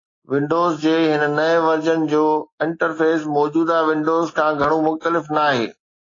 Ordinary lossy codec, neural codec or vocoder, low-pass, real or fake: AAC, 32 kbps; none; 7.2 kHz; real